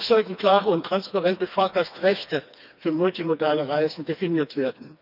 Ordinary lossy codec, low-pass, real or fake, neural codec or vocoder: none; 5.4 kHz; fake; codec, 16 kHz, 2 kbps, FreqCodec, smaller model